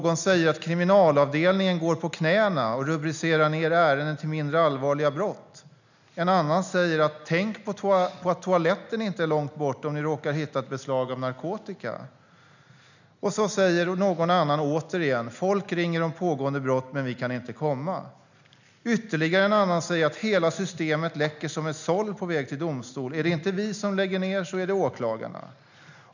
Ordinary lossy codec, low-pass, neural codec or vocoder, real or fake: none; 7.2 kHz; none; real